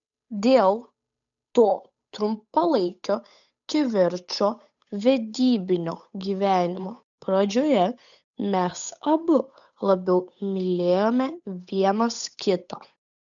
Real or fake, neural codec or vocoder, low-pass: fake; codec, 16 kHz, 8 kbps, FunCodec, trained on Chinese and English, 25 frames a second; 7.2 kHz